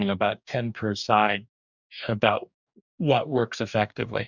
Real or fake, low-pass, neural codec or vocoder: fake; 7.2 kHz; codec, 44.1 kHz, 2.6 kbps, DAC